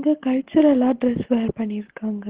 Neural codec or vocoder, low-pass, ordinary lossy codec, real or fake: none; 3.6 kHz; Opus, 16 kbps; real